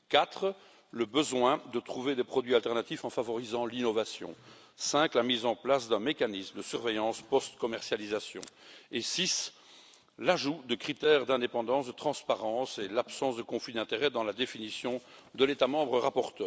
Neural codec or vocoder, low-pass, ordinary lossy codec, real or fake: none; none; none; real